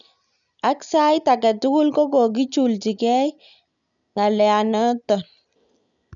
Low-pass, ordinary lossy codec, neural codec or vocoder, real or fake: 7.2 kHz; none; none; real